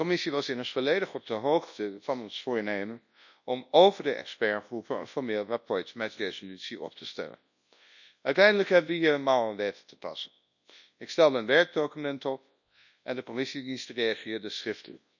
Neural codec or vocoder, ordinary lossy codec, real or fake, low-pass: codec, 24 kHz, 0.9 kbps, WavTokenizer, large speech release; none; fake; 7.2 kHz